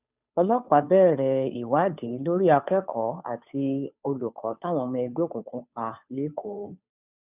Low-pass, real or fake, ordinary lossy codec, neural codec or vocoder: 3.6 kHz; fake; none; codec, 16 kHz, 2 kbps, FunCodec, trained on Chinese and English, 25 frames a second